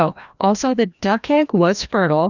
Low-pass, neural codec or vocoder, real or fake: 7.2 kHz; codec, 16 kHz, 1 kbps, FreqCodec, larger model; fake